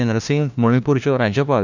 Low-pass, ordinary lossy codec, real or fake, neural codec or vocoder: 7.2 kHz; none; fake; codec, 16 kHz, 1 kbps, FunCodec, trained on LibriTTS, 50 frames a second